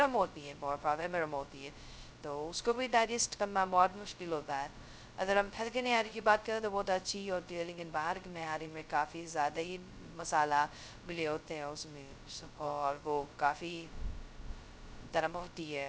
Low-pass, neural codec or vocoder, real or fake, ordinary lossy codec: none; codec, 16 kHz, 0.2 kbps, FocalCodec; fake; none